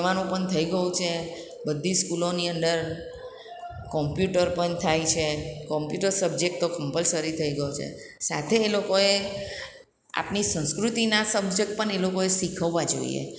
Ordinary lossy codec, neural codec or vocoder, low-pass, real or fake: none; none; none; real